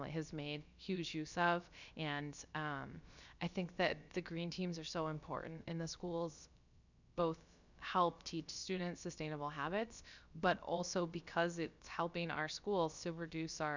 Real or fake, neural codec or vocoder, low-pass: fake; codec, 16 kHz, about 1 kbps, DyCAST, with the encoder's durations; 7.2 kHz